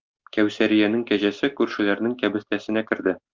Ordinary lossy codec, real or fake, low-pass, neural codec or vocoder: Opus, 32 kbps; real; 7.2 kHz; none